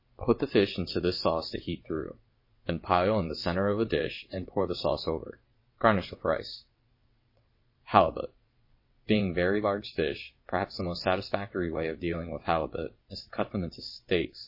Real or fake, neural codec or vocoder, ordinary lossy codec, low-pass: fake; vocoder, 22.05 kHz, 80 mel bands, WaveNeXt; MP3, 24 kbps; 5.4 kHz